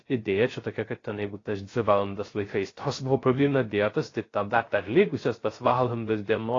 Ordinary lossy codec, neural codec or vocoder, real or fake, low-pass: AAC, 32 kbps; codec, 16 kHz, 0.3 kbps, FocalCodec; fake; 7.2 kHz